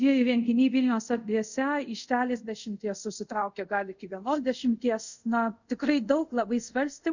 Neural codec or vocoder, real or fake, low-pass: codec, 24 kHz, 0.5 kbps, DualCodec; fake; 7.2 kHz